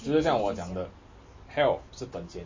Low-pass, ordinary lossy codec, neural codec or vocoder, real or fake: 7.2 kHz; MP3, 32 kbps; none; real